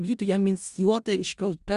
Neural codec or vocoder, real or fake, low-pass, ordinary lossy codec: codec, 16 kHz in and 24 kHz out, 0.4 kbps, LongCat-Audio-Codec, four codebook decoder; fake; 10.8 kHz; Opus, 64 kbps